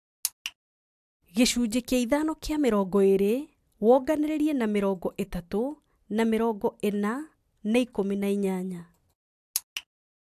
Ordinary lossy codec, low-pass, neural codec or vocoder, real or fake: AAC, 96 kbps; 14.4 kHz; none; real